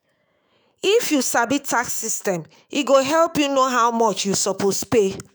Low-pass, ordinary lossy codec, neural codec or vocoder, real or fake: none; none; autoencoder, 48 kHz, 128 numbers a frame, DAC-VAE, trained on Japanese speech; fake